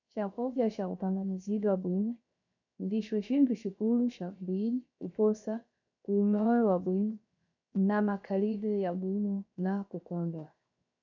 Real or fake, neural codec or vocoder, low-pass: fake; codec, 16 kHz, 0.7 kbps, FocalCodec; 7.2 kHz